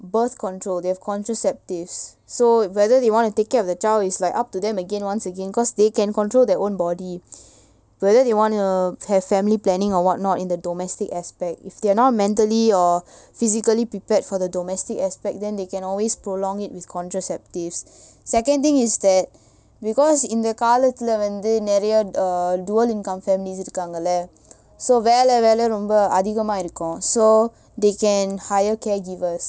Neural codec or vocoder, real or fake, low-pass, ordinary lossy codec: none; real; none; none